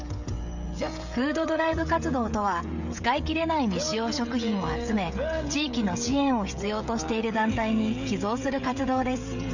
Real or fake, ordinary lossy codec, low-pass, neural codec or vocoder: fake; none; 7.2 kHz; codec, 16 kHz, 16 kbps, FreqCodec, smaller model